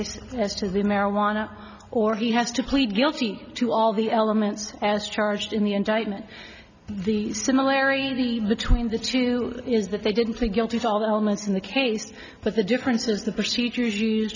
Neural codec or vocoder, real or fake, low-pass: none; real; 7.2 kHz